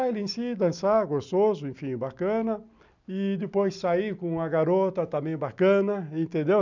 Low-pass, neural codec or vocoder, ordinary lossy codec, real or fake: 7.2 kHz; none; none; real